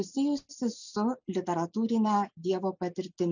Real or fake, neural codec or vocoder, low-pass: real; none; 7.2 kHz